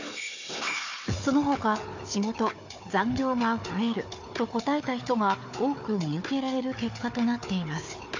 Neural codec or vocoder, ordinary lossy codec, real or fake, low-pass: codec, 16 kHz, 4 kbps, FunCodec, trained on Chinese and English, 50 frames a second; AAC, 48 kbps; fake; 7.2 kHz